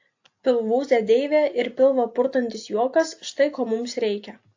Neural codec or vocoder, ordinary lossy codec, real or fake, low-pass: none; AAC, 48 kbps; real; 7.2 kHz